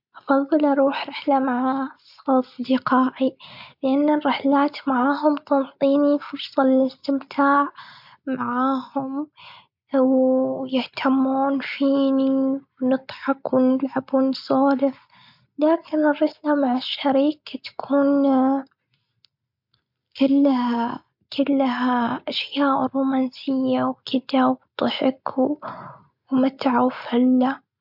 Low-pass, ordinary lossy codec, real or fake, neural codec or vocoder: 5.4 kHz; MP3, 48 kbps; real; none